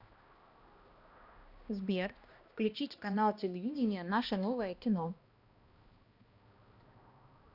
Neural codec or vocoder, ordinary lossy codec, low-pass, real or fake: codec, 16 kHz, 1 kbps, X-Codec, HuBERT features, trained on balanced general audio; Opus, 64 kbps; 5.4 kHz; fake